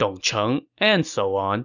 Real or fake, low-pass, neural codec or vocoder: real; 7.2 kHz; none